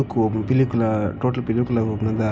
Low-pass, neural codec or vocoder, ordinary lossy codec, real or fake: none; none; none; real